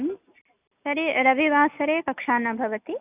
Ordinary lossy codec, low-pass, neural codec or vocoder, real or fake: none; 3.6 kHz; none; real